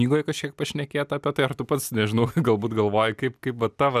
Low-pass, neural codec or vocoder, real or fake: 14.4 kHz; none; real